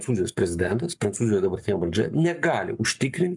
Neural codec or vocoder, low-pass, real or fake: codec, 44.1 kHz, 7.8 kbps, Pupu-Codec; 10.8 kHz; fake